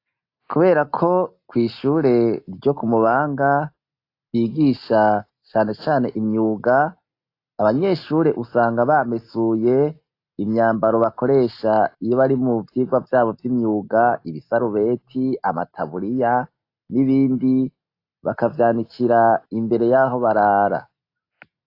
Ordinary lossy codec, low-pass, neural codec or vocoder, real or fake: AAC, 32 kbps; 5.4 kHz; none; real